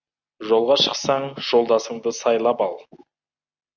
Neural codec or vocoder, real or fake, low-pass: none; real; 7.2 kHz